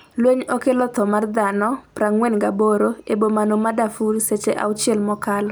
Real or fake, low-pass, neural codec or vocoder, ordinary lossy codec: real; none; none; none